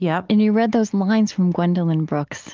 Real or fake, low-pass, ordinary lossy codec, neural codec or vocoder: real; 7.2 kHz; Opus, 32 kbps; none